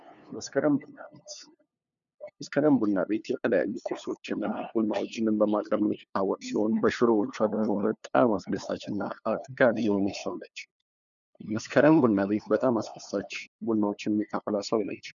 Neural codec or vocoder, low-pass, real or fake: codec, 16 kHz, 2 kbps, FunCodec, trained on LibriTTS, 25 frames a second; 7.2 kHz; fake